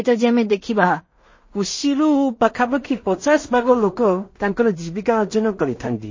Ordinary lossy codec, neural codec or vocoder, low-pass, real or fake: MP3, 32 kbps; codec, 16 kHz in and 24 kHz out, 0.4 kbps, LongCat-Audio-Codec, two codebook decoder; 7.2 kHz; fake